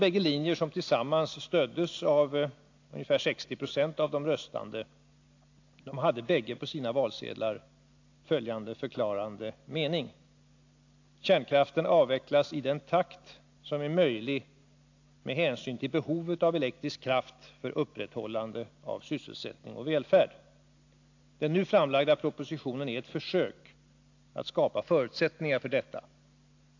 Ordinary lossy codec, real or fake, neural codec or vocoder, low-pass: AAC, 48 kbps; real; none; 7.2 kHz